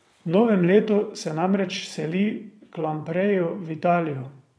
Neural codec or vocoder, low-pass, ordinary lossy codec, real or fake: vocoder, 22.05 kHz, 80 mel bands, WaveNeXt; none; none; fake